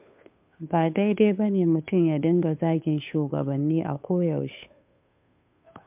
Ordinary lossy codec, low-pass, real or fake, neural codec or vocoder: MP3, 32 kbps; 3.6 kHz; fake; codec, 16 kHz, 2 kbps, FunCodec, trained on Chinese and English, 25 frames a second